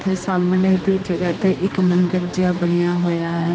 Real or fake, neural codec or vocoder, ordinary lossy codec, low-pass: fake; codec, 16 kHz, 2 kbps, X-Codec, HuBERT features, trained on general audio; none; none